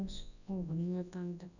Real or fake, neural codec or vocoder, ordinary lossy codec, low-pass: fake; codec, 24 kHz, 0.9 kbps, WavTokenizer, large speech release; none; 7.2 kHz